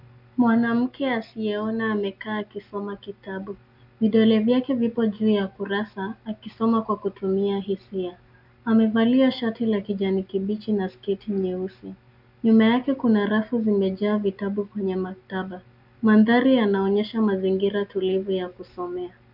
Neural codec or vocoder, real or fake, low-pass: none; real; 5.4 kHz